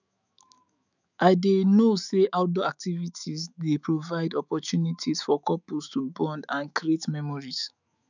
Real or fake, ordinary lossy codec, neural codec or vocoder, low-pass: fake; none; autoencoder, 48 kHz, 128 numbers a frame, DAC-VAE, trained on Japanese speech; 7.2 kHz